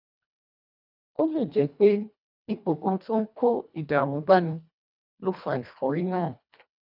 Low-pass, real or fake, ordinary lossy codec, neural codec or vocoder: 5.4 kHz; fake; none; codec, 24 kHz, 1.5 kbps, HILCodec